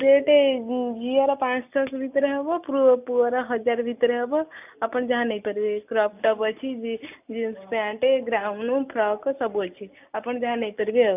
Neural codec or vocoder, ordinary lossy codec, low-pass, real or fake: none; none; 3.6 kHz; real